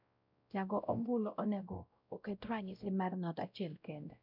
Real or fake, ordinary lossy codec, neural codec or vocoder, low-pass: fake; none; codec, 16 kHz, 0.5 kbps, X-Codec, WavLM features, trained on Multilingual LibriSpeech; 5.4 kHz